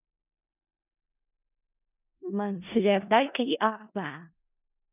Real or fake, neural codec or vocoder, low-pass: fake; codec, 16 kHz in and 24 kHz out, 0.4 kbps, LongCat-Audio-Codec, four codebook decoder; 3.6 kHz